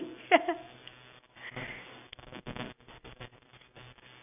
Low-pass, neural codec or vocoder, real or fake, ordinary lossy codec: 3.6 kHz; none; real; none